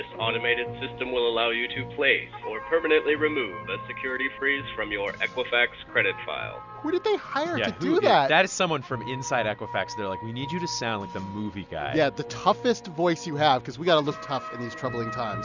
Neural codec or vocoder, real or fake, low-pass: none; real; 7.2 kHz